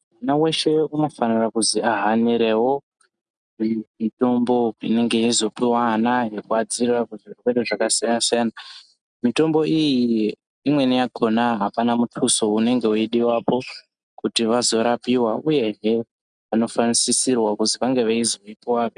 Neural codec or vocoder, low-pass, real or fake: none; 9.9 kHz; real